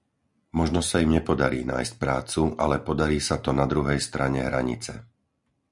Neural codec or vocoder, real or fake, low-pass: none; real; 10.8 kHz